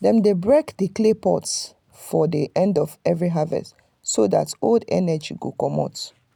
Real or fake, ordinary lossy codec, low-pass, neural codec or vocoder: real; none; 19.8 kHz; none